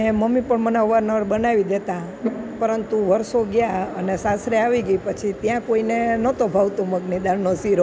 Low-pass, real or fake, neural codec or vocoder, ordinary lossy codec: none; real; none; none